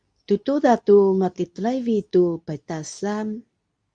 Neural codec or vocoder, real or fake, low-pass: codec, 24 kHz, 0.9 kbps, WavTokenizer, medium speech release version 2; fake; 9.9 kHz